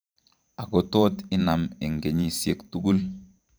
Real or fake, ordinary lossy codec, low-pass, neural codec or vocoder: fake; none; none; vocoder, 44.1 kHz, 128 mel bands every 512 samples, BigVGAN v2